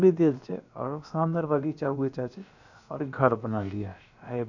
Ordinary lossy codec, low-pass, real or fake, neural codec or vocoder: none; 7.2 kHz; fake; codec, 16 kHz, about 1 kbps, DyCAST, with the encoder's durations